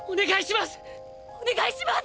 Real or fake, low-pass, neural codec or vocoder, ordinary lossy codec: real; none; none; none